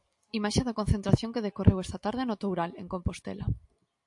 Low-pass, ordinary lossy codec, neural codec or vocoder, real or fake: 10.8 kHz; AAC, 64 kbps; none; real